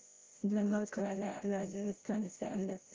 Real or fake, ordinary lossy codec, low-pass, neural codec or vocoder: fake; Opus, 16 kbps; 7.2 kHz; codec, 16 kHz, 0.5 kbps, FreqCodec, larger model